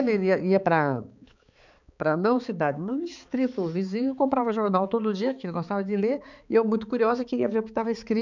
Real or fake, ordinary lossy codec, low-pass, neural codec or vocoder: fake; none; 7.2 kHz; codec, 16 kHz, 4 kbps, X-Codec, HuBERT features, trained on balanced general audio